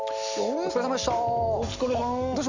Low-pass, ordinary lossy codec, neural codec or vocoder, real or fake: 7.2 kHz; Opus, 64 kbps; none; real